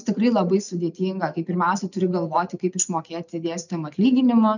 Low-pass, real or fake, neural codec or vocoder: 7.2 kHz; real; none